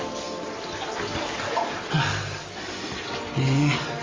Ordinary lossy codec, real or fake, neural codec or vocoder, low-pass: Opus, 32 kbps; real; none; 7.2 kHz